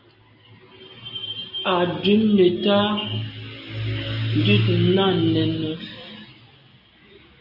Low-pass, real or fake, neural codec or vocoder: 5.4 kHz; real; none